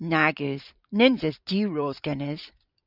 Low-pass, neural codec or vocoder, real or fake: 5.4 kHz; vocoder, 44.1 kHz, 128 mel bands every 512 samples, BigVGAN v2; fake